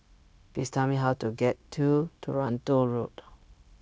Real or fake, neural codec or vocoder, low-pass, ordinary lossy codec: fake; codec, 16 kHz, 0.9 kbps, LongCat-Audio-Codec; none; none